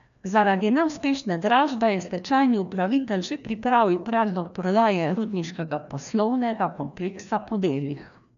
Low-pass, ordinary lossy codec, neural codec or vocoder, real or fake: 7.2 kHz; none; codec, 16 kHz, 1 kbps, FreqCodec, larger model; fake